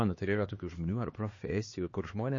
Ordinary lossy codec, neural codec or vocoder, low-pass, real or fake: MP3, 32 kbps; codec, 16 kHz, 2 kbps, X-Codec, HuBERT features, trained on LibriSpeech; 7.2 kHz; fake